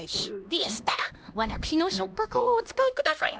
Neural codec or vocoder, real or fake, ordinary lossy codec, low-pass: codec, 16 kHz, 1 kbps, X-Codec, HuBERT features, trained on LibriSpeech; fake; none; none